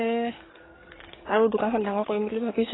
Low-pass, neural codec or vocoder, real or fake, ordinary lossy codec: 7.2 kHz; codec, 44.1 kHz, 7.8 kbps, DAC; fake; AAC, 16 kbps